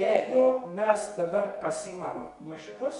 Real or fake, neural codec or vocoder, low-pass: fake; codec, 24 kHz, 0.9 kbps, WavTokenizer, medium music audio release; 10.8 kHz